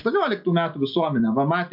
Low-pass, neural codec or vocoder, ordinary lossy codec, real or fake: 5.4 kHz; none; MP3, 48 kbps; real